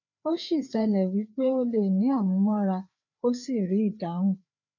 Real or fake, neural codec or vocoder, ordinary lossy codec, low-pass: fake; codec, 16 kHz, 4 kbps, FreqCodec, larger model; none; 7.2 kHz